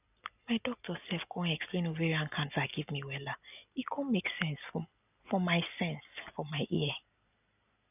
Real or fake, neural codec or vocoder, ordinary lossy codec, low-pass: real; none; none; 3.6 kHz